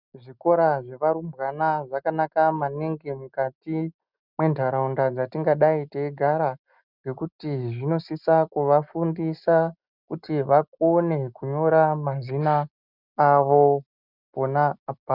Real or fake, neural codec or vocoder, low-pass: real; none; 5.4 kHz